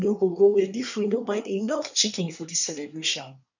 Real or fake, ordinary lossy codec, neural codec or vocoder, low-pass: fake; none; codec, 24 kHz, 1 kbps, SNAC; 7.2 kHz